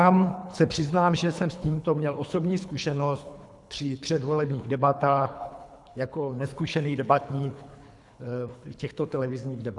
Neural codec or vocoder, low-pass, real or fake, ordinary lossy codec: codec, 24 kHz, 3 kbps, HILCodec; 10.8 kHz; fake; MP3, 96 kbps